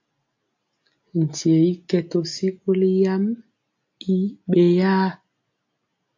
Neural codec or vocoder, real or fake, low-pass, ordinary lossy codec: none; real; 7.2 kHz; AAC, 48 kbps